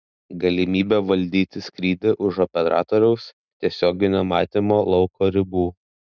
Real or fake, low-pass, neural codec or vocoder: real; 7.2 kHz; none